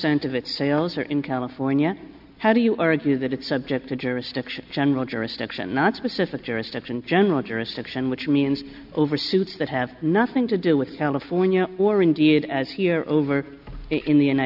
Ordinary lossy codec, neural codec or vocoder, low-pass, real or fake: MP3, 48 kbps; none; 5.4 kHz; real